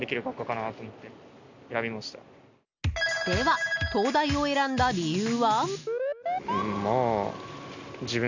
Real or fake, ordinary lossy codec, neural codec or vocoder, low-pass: real; none; none; 7.2 kHz